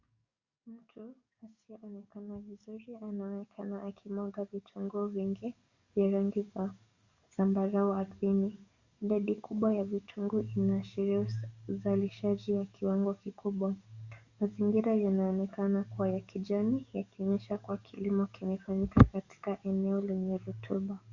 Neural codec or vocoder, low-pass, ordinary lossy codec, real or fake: codec, 44.1 kHz, 7.8 kbps, DAC; 7.2 kHz; Opus, 64 kbps; fake